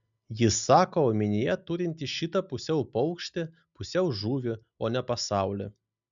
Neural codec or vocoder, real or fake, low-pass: none; real; 7.2 kHz